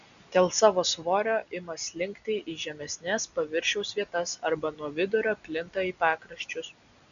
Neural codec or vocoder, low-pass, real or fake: none; 7.2 kHz; real